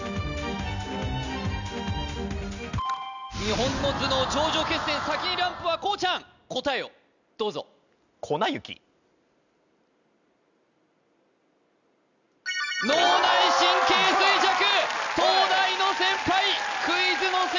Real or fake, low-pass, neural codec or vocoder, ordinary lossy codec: real; 7.2 kHz; none; none